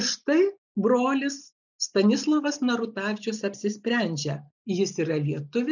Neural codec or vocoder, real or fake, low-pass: none; real; 7.2 kHz